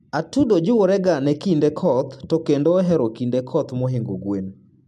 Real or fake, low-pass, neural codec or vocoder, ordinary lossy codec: real; 14.4 kHz; none; MP3, 64 kbps